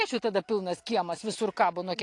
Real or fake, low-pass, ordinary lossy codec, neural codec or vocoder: real; 10.8 kHz; AAC, 48 kbps; none